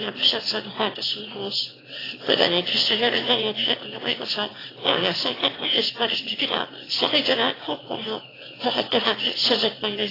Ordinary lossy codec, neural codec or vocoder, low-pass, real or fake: AAC, 24 kbps; autoencoder, 22.05 kHz, a latent of 192 numbers a frame, VITS, trained on one speaker; 5.4 kHz; fake